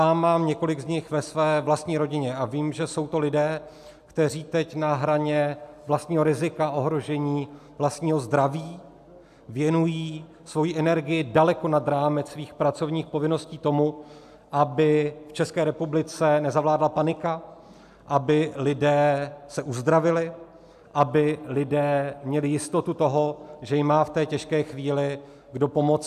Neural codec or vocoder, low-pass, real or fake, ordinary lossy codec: none; 14.4 kHz; real; AAC, 96 kbps